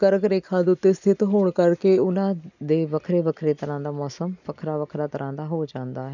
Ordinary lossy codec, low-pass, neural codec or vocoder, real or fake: none; 7.2 kHz; none; real